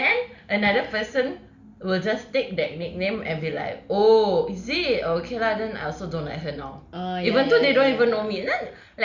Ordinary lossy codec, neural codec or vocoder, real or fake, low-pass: Opus, 64 kbps; none; real; 7.2 kHz